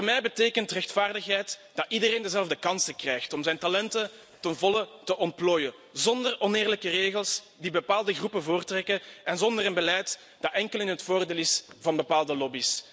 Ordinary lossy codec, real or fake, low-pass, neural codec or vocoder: none; real; none; none